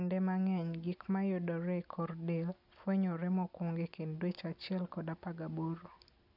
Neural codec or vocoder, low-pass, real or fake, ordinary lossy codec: none; 5.4 kHz; real; none